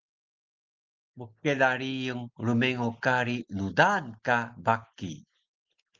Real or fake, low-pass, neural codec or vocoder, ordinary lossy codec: real; 7.2 kHz; none; Opus, 24 kbps